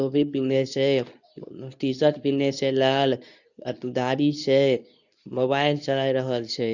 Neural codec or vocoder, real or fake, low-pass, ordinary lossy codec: codec, 24 kHz, 0.9 kbps, WavTokenizer, medium speech release version 2; fake; 7.2 kHz; none